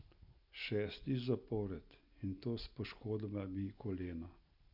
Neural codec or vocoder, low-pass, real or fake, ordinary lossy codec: none; 5.4 kHz; real; none